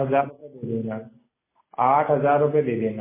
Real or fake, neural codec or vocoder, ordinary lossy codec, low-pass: real; none; MP3, 16 kbps; 3.6 kHz